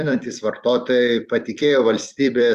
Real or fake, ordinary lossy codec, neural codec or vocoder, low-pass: fake; Opus, 64 kbps; vocoder, 44.1 kHz, 128 mel bands every 256 samples, BigVGAN v2; 14.4 kHz